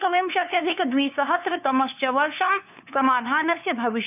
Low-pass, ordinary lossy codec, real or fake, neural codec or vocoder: 3.6 kHz; none; fake; codec, 16 kHz, 2 kbps, FunCodec, trained on LibriTTS, 25 frames a second